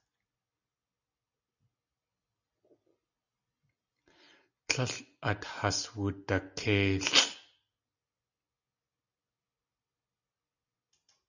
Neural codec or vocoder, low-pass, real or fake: none; 7.2 kHz; real